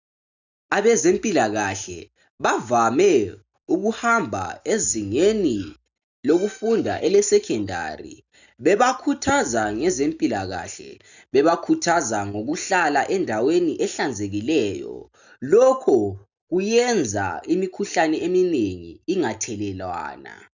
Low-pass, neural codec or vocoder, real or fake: 7.2 kHz; none; real